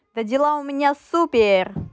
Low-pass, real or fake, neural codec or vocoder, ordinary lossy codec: none; real; none; none